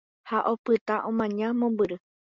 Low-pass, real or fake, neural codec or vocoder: 7.2 kHz; real; none